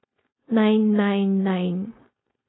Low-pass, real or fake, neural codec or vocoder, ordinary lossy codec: 7.2 kHz; fake; codec, 16 kHz, 4.8 kbps, FACodec; AAC, 16 kbps